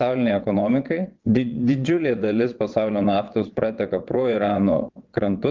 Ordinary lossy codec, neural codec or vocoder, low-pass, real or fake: Opus, 32 kbps; vocoder, 22.05 kHz, 80 mel bands, WaveNeXt; 7.2 kHz; fake